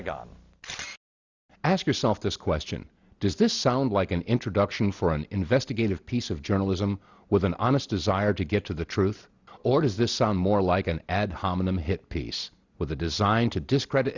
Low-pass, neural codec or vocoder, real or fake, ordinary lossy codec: 7.2 kHz; none; real; Opus, 64 kbps